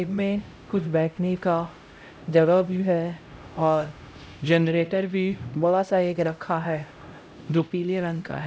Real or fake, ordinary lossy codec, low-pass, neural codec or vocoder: fake; none; none; codec, 16 kHz, 0.5 kbps, X-Codec, HuBERT features, trained on LibriSpeech